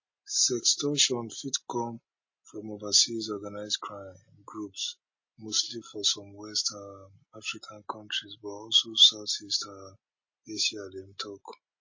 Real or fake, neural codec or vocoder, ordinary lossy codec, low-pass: real; none; MP3, 32 kbps; 7.2 kHz